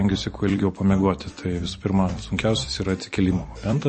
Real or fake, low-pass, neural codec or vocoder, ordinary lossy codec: fake; 10.8 kHz; vocoder, 44.1 kHz, 128 mel bands every 256 samples, BigVGAN v2; MP3, 32 kbps